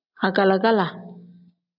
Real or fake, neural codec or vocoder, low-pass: real; none; 5.4 kHz